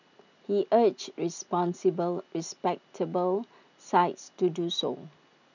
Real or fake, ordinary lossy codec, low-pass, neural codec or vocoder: real; none; 7.2 kHz; none